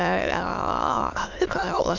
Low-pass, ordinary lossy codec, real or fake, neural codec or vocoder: 7.2 kHz; none; fake; autoencoder, 22.05 kHz, a latent of 192 numbers a frame, VITS, trained on many speakers